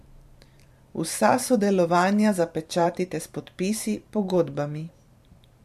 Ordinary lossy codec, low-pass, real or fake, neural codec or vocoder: MP3, 64 kbps; 14.4 kHz; fake; vocoder, 44.1 kHz, 128 mel bands every 512 samples, BigVGAN v2